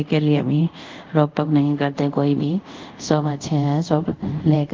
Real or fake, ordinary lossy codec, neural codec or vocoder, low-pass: fake; Opus, 32 kbps; codec, 24 kHz, 0.5 kbps, DualCodec; 7.2 kHz